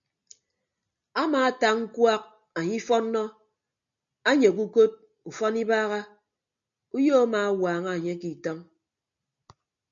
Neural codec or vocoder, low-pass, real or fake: none; 7.2 kHz; real